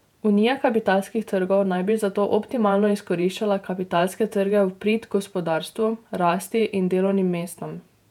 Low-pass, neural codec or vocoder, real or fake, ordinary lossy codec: 19.8 kHz; vocoder, 48 kHz, 128 mel bands, Vocos; fake; none